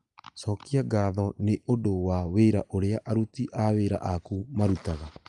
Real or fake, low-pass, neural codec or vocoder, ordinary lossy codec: real; 10.8 kHz; none; Opus, 32 kbps